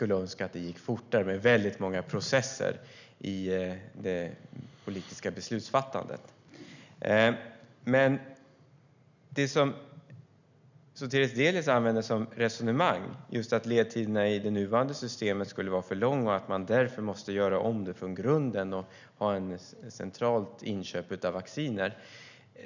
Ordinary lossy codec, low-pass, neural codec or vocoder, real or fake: none; 7.2 kHz; none; real